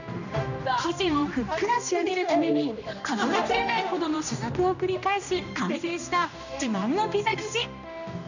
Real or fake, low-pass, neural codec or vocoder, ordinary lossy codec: fake; 7.2 kHz; codec, 16 kHz, 1 kbps, X-Codec, HuBERT features, trained on general audio; none